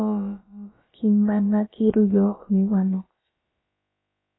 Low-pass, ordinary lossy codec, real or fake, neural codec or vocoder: 7.2 kHz; AAC, 16 kbps; fake; codec, 16 kHz, about 1 kbps, DyCAST, with the encoder's durations